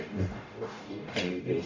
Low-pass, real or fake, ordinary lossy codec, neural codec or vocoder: 7.2 kHz; fake; AAC, 48 kbps; codec, 44.1 kHz, 0.9 kbps, DAC